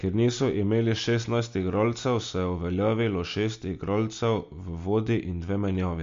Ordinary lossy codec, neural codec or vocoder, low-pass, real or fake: MP3, 48 kbps; none; 7.2 kHz; real